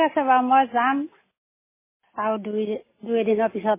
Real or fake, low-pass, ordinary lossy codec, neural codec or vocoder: real; 3.6 kHz; MP3, 16 kbps; none